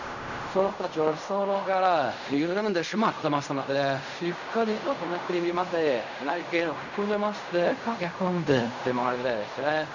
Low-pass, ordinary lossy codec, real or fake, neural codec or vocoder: 7.2 kHz; none; fake; codec, 16 kHz in and 24 kHz out, 0.4 kbps, LongCat-Audio-Codec, fine tuned four codebook decoder